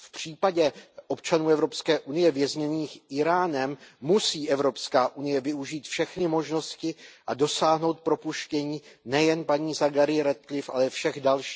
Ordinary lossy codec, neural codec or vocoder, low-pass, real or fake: none; none; none; real